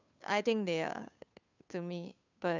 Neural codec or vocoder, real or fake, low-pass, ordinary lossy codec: codec, 16 kHz, 2 kbps, FunCodec, trained on Chinese and English, 25 frames a second; fake; 7.2 kHz; none